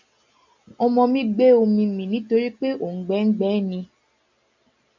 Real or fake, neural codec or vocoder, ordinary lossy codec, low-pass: real; none; MP3, 64 kbps; 7.2 kHz